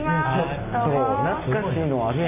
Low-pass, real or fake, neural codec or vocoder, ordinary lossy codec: 3.6 kHz; real; none; none